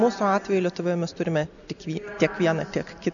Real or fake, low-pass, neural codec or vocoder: real; 7.2 kHz; none